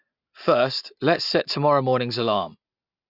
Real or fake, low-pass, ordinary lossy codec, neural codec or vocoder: real; 5.4 kHz; none; none